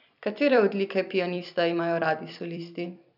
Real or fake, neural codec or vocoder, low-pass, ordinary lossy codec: fake; vocoder, 44.1 kHz, 128 mel bands every 256 samples, BigVGAN v2; 5.4 kHz; none